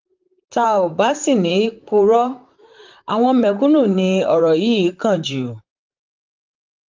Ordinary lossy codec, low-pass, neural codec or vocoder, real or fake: Opus, 32 kbps; 7.2 kHz; vocoder, 44.1 kHz, 128 mel bands, Pupu-Vocoder; fake